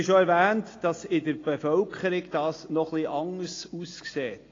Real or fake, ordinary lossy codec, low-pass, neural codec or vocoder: real; AAC, 32 kbps; 7.2 kHz; none